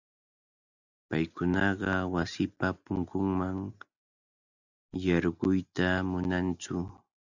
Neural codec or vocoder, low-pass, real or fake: none; 7.2 kHz; real